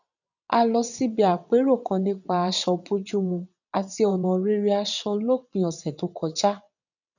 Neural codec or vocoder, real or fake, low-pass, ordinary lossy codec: vocoder, 22.05 kHz, 80 mel bands, WaveNeXt; fake; 7.2 kHz; none